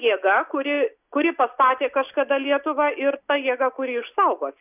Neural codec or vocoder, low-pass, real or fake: none; 3.6 kHz; real